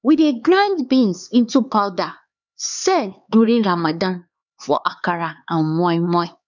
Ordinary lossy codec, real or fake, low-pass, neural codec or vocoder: none; fake; 7.2 kHz; codec, 16 kHz, 4 kbps, X-Codec, HuBERT features, trained on LibriSpeech